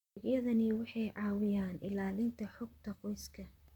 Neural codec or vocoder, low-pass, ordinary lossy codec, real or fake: vocoder, 44.1 kHz, 128 mel bands, Pupu-Vocoder; 19.8 kHz; none; fake